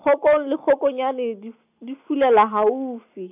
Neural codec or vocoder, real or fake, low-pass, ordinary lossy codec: none; real; 3.6 kHz; none